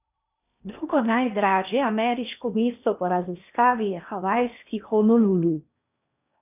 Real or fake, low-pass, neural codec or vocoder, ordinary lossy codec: fake; 3.6 kHz; codec, 16 kHz in and 24 kHz out, 0.8 kbps, FocalCodec, streaming, 65536 codes; none